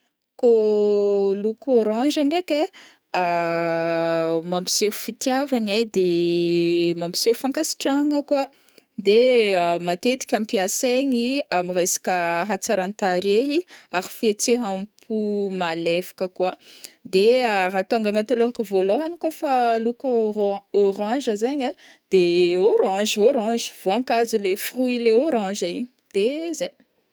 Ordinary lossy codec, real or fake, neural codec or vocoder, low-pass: none; fake; codec, 44.1 kHz, 2.6 kbps, SNAC; none